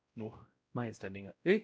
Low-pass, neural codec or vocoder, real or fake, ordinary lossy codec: none; codec, 16 kHz, 0.5 kbps, X-Codec, WavLM features, trained on Multilingual LibriSpeech; fake; none